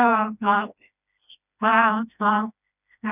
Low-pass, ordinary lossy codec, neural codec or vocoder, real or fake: 3.6 kHz; none; codec, 16 kHz, 1 kbps, FreqCodec, smaller model; fake